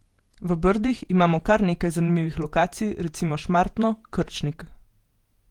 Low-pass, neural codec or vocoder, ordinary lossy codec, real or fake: 19.8 kHz; vocoder, 48 kHz, 128 mel bands, Vocos; Opus, 24 kbps; fake